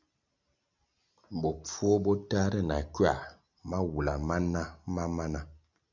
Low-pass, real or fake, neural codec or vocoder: 7.2 kHz; real; none